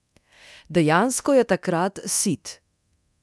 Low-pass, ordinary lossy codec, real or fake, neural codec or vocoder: none; none; fake; codec, 24 kHz, 0.9 kbps, DualCodec